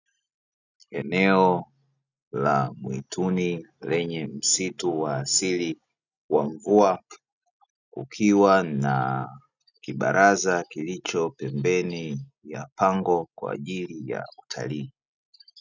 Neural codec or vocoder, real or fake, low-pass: none; real; 7.2 kHz